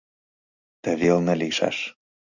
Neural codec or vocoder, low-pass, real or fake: none; 7.2 kHz; real